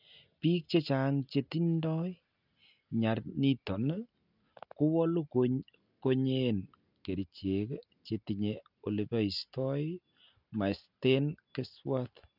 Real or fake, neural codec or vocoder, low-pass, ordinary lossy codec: real; none; 5.4 kHz; none